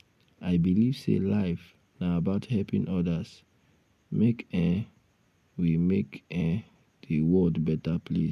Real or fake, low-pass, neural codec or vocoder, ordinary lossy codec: real; 14.4 kHz; none; none